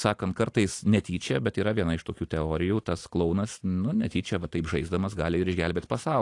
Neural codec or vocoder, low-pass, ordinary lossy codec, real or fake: none; 10.8 kHz; AAC, 48 kbps; real